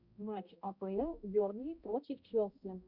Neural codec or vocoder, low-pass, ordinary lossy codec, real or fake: codec, 16 kHz, 0.5 kbps, X-Codec, HuBERT features, trained on balanced general audio; 5.4 kHz; Opus, 64 kbps; fake